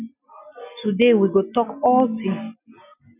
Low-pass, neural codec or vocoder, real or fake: 3.6 kHz; none; real